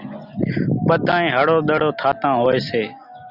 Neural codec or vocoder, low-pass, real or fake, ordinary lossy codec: none; 5.4 kHz; real; Opus, 64 kbps